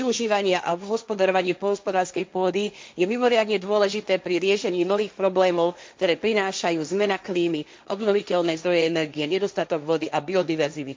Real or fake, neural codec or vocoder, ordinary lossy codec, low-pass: fake; codec, 16 kHz, 1.1 kbps, Voila-Tokenizer; none; none